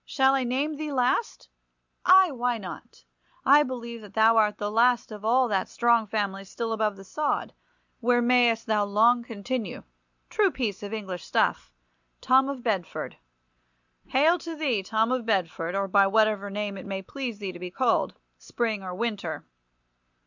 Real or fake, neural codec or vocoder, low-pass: real; none; 7.2 kHz